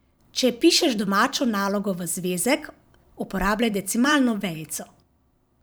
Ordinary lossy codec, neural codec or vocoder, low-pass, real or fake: none; vocoder, 44.1 kHz, 128 mel bands every 512 samples, BigVGAN v2; none; fake